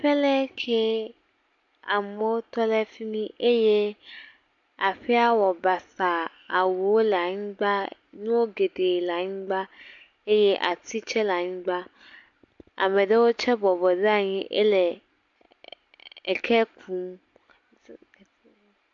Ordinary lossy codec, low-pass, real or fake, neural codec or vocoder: AAC, 64 kbps; 7.2 kHz; real; none